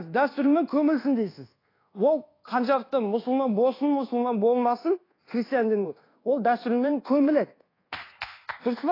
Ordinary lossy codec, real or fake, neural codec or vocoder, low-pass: AAC, 24 kbps; fake; codec, 24 kHz, 1.2 kbps, DualCodec; 5.4 kHz